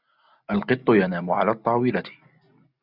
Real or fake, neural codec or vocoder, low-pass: real; none; 5.4 kHz